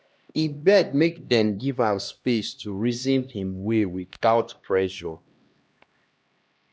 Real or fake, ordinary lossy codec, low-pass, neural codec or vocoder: fake; none; none; codec, 16 kHz, 1 kbps, X-Codec, HuBERT features, trained on LibriSpeech